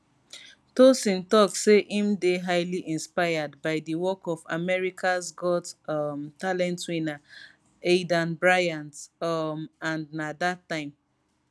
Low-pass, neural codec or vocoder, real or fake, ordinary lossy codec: none; none; real; none